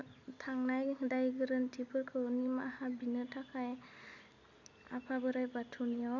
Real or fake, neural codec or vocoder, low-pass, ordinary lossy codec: real; none; 7.2 kHz; none